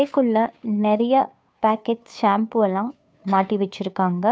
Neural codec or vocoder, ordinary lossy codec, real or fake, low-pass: codec, 16 kHz, 2 kbps, FunCodec, trained on Chinese and English, 25 frames a second; none; fake; none